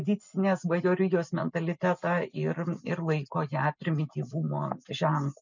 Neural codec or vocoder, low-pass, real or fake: none; 7.2 kHz; real